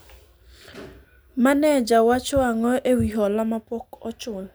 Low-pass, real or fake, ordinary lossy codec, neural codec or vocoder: none; real; none; none